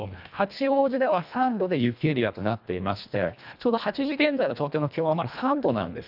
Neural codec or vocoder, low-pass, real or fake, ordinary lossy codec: codec, 24 kHz, 1.5 kbps, HILCodec; 5.4 kHz; fake; none